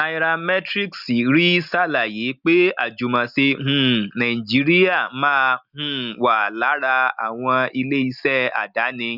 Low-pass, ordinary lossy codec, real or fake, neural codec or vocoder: 5.4 kHz; none; real; none